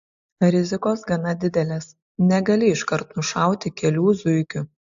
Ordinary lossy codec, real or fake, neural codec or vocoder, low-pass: AAC, 64 kbps; real; none; 7.2 kHz